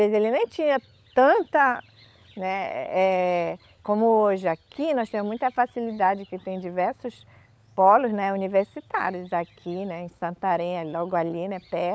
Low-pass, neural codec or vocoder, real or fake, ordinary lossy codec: none; codec, 16 kHz, 16 kbps, FunCodec, trained on LibriTTS, 50 frames a second; fake; none